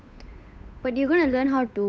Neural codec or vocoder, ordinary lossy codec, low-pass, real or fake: codec, 16 kHz, 8 kbps, FunCodec, trained on Chinese and English, 25 frames a second; none; none; fake